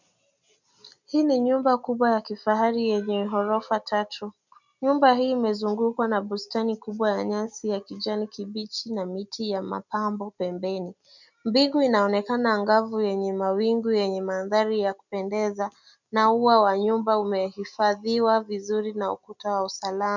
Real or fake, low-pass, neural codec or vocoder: real; 7.2 kHz; none